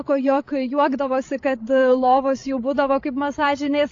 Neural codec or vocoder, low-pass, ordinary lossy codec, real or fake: codec, 16 kHz, 16 kbps, FreqCodec, larger model; 7.2 kHz; AAC, 48 kbps; fake